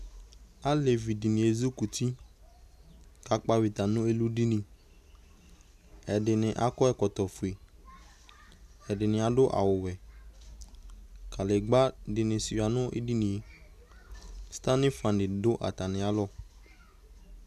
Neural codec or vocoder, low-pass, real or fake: none; 14.4 kHz; real